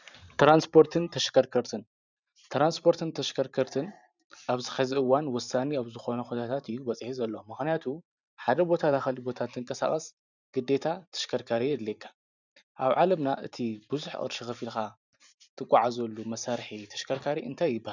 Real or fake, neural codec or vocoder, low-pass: fake; vocoder, 44.1 kHz, 128 mel bands every 512 samples, BigVGAN v2; 7.2 kHz